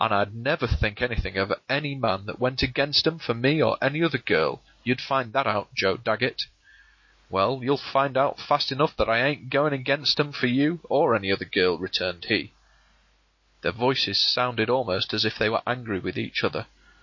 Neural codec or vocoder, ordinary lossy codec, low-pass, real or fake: none; MP3, 32 kbps; 7.2 kHz; real